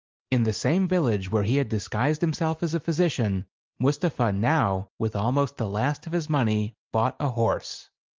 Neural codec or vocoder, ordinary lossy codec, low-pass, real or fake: none; Opus, 24 kbps; 7.2 kHz; real